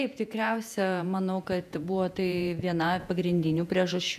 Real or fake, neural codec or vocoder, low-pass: fake; vocoder, 48 kHz, 128 mel bands, Vocos; 14.4 kHz